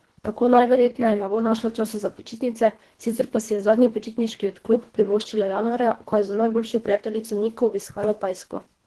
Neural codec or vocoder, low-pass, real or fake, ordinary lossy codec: codec, 24 kHz, 1.5 kbps, HILCodec; 10.8 kHz; fake; Opus, 16 kbps